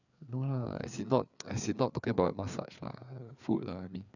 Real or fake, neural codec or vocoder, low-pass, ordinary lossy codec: fake; codec, 16 kHz, 4 kbps, FreqCodec, larger model; 7.2 kHz; none